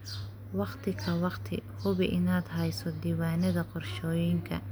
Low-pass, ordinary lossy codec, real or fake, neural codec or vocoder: none; none; real; none